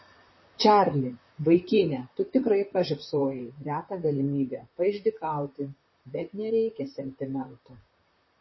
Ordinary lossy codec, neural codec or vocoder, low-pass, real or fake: MP3, 24 kbps; vocoder, 44.1 kHz, 128 mel bands, Pupu-Vocoder; 7.2 kHz; fake